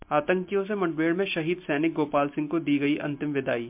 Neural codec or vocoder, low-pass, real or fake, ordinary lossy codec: none; 3.6 kHz; real; MP3, 32 kbps